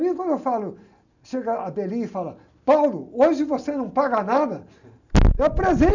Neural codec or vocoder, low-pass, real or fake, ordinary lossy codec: none; 7.2 kHz; real; none